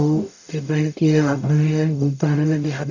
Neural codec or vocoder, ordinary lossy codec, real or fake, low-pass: codec, 44.1 kHz, 0.9 kbps, DAC; none; fake; 7.2 kHz